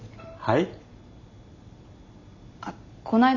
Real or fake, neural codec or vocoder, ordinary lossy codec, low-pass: real; none; none; 7.2 kHz